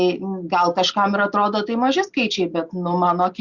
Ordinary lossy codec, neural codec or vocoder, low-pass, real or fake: Opus, 64 kbps; none; 7.2 kHz; real